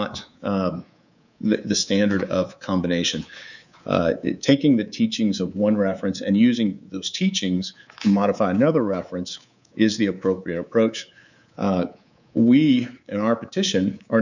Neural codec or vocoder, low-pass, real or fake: codec, 24 kHz, 3.1 kbps, DualCodec; 7.2 kHz; fake